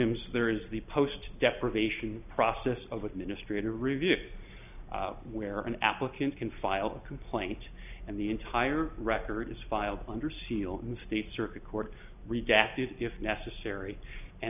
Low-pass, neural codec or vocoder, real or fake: 3.6 kHz; none; real